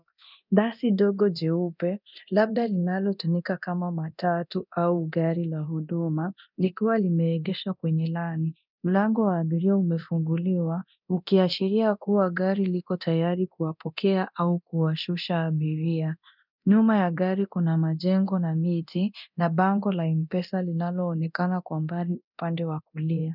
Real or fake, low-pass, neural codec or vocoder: fake; 5.4 kHz; codec, 24 kHz, 0.9 kbps, DualCodec